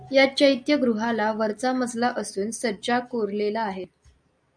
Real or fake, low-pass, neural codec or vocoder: real; 9.9 kHz; none